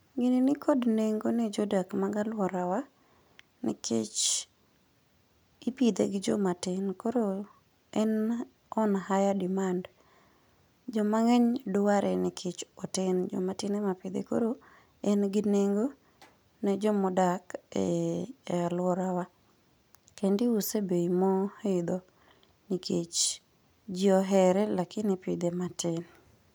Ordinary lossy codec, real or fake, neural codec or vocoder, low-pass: none; real; none; none